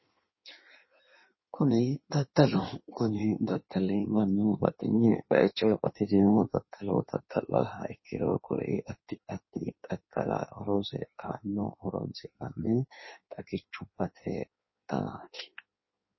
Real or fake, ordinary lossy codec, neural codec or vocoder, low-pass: fake; MP3, 24 kbps; codec, 16 kHz in and 24 kHz out, 1.1 kbps, FireRedTTS-2 codec; 7.2 kHz